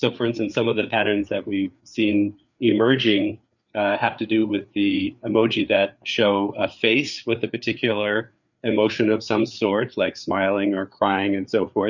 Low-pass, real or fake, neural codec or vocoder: 7.2 kHz; fake; codec, 16 kHz, 4 kbps, FreqCodec, larger model